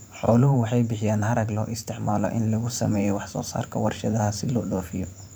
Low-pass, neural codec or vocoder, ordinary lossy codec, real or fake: none; vocoder, 44.1 kHz, 128 mel bands every 512 samples, BigVGAN v2; none; fake